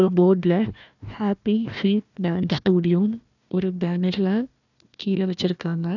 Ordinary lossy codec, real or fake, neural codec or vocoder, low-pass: none; fake; codec, 16 kHz, 1 kbps, FunCodec, trained on Chinese and English, 50 frames a second; 7.2 kHz